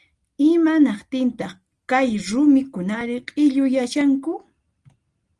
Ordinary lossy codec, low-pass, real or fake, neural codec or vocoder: Opus, 24 kbps; 10.8 kHz; real; none